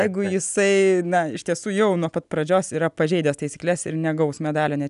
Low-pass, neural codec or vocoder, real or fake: 10.8 kHz; none; real